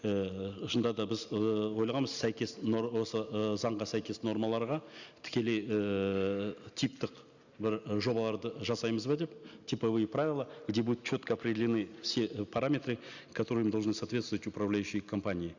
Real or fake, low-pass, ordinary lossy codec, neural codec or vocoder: real; 7.2 kHz; Opus, 64 kbps; none